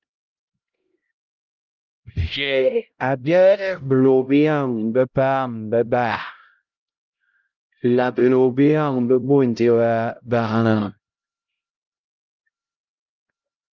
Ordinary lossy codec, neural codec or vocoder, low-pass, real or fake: Opus, 24 kbps; codec, 16 kHz, 0.5 kbps, X-Codec, HuBERT features, trained on LibriSpeech; 7.2 kHz; fake